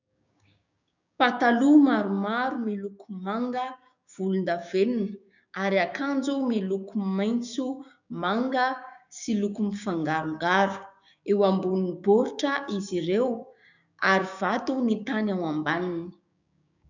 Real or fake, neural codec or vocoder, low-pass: fake; codec, 16 kHz, 6 kbps, DAC; 7.2 kHz